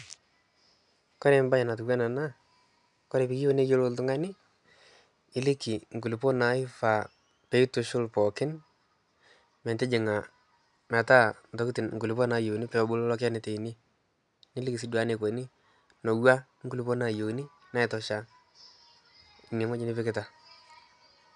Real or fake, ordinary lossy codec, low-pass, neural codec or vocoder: real; none; 10.8 kHz; none